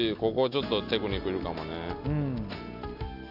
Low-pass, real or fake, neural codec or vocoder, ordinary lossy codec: 5.4 kHz; real; none; none